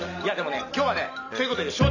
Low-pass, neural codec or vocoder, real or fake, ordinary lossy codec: 7.2 kHz; none; real; none